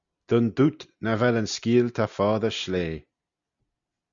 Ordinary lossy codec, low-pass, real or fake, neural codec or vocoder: Opus, 64 kbps; 7.2 kHz; real; none